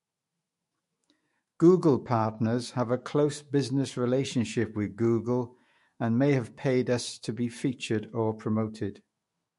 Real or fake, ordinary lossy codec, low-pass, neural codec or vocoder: fake; MP3, 48 kbps; 14.4 kHz; autoencoder, 48 kHz, 128 numbers a frame, DAC-VAE, trained on Japanese speech